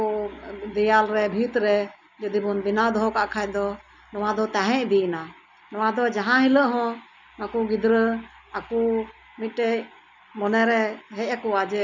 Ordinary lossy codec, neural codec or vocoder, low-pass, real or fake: none; none; 7.2 kHz; real